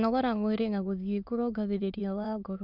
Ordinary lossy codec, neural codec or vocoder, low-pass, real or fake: none; autoencoder, 22.05 kHz, a latent of 192 numbers a frame, VITS, trained on many speakers; 5.4 kHz; fake